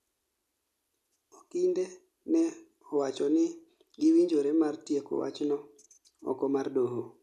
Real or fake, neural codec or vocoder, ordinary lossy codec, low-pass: real; none; none; 14.4 kHz